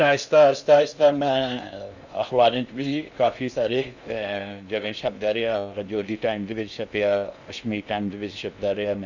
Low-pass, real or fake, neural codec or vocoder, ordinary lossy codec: 7.2 kHz; fake; codec, 16 kHz in and 24 kHz out, 0.6 kbps, FocalCodec, streaming, 2048 codes; none